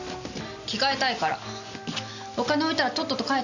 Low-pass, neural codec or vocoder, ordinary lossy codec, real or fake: 7.2 kHz; none; none; real